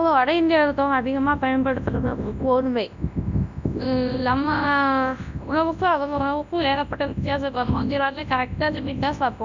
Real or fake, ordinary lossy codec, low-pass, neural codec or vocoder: fake; none; 7.2 kHz; codec, 24 kHz, 0.9 kbps, WavTokenizer, large speech release